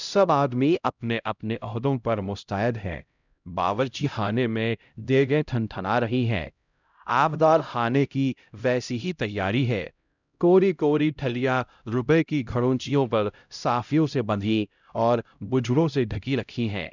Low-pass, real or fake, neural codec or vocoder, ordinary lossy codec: 7.2 kHz; fake; codec, 16 kHz, 0.5 kbps, X-Codec, HuBERT features, trained on LibriSpeech; none